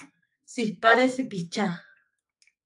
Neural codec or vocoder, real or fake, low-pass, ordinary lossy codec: codec, 44.1 kHz, 2.6 kbps, SNAC; fake; 10.8 kHz; AAC, 64 kbps